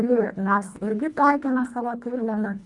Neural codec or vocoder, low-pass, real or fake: codec, 24 kHz, 1.5 kbps, HILCodec; 10.8 kHz; fake